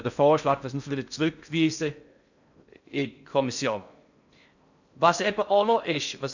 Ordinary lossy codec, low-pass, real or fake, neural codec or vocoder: none; 7.2 kHz; fake; codec, 16 kHz in and 24 kHz out, 0.6 kbps, FocalCodec, streaming, 4096 codes